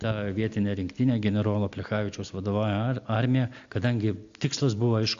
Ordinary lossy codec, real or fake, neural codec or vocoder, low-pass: AAC, 64 kbps; real; none; 7.2 kHz